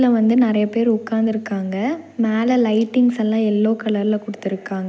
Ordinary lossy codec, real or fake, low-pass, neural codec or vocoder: none; real; none; none